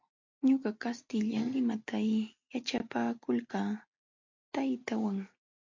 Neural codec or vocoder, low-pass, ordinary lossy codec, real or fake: none; 7.2 kHz; MP3, 48 kbps; real